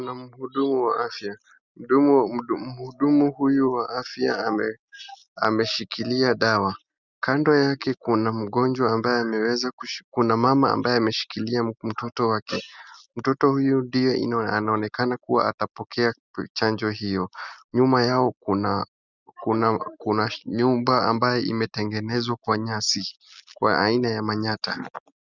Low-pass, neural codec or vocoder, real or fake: 7.2 kHz; none; real